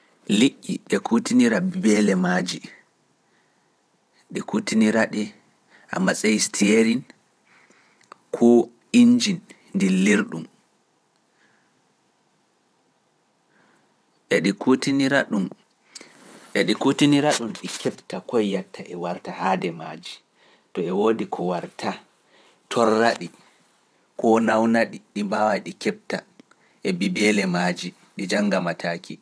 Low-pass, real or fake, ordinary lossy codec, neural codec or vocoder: none; fake; none; vocoder, 22.05 kHz, 80 mel bands, WaveNeXt